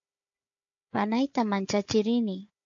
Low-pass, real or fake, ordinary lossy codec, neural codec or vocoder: 7.2 kHz; fake; AAC, 48 kbps; codec, 16 kHz, 4 kbps, FunCodec, trained on Chinese and English, 50 frames a second